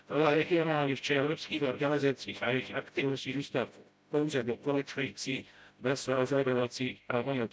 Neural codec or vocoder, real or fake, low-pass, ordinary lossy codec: codec, 16 kHz, 0.5 kbps, FreqCodec, smaller model; fake; none; none